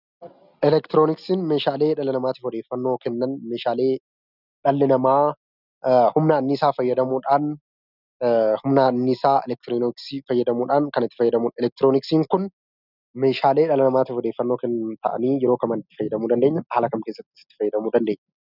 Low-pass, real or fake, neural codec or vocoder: 5.4 kHz; real; none